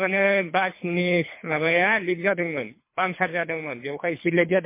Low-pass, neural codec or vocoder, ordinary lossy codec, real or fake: 3.6 kHz; codec, 24 kHz, 3 kbps, HILCodec; MP3, 24 kbps; fake